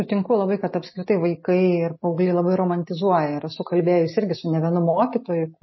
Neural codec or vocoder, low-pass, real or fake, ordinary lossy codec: none; 7.2 kHz; real; MP3, 24 kbps